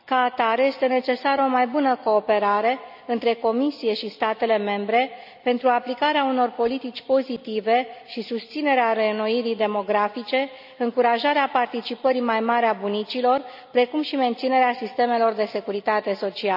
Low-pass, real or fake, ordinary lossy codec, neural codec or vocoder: 5.4 kHz; real; none; none